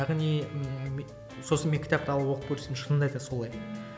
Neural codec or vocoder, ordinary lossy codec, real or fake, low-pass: none; none; real; none